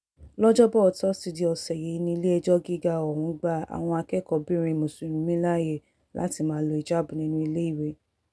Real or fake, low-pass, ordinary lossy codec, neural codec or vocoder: real; none; none; none